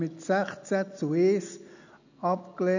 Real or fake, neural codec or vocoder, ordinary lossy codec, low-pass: real; none; none; 7.2 kHz